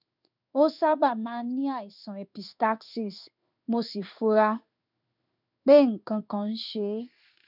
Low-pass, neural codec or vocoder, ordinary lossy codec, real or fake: 5.4 kHz; codec, 16 kHz in and 24 kHz out, 1 kbps, XY-Tokenizer; none; fake